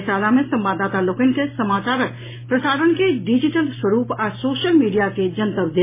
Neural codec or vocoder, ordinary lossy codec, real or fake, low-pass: none; MP3, 16 kbps; real; 3.6 kHz